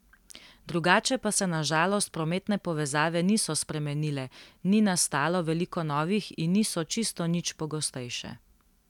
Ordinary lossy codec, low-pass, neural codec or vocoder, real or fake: none; 19.8 kHz; none; real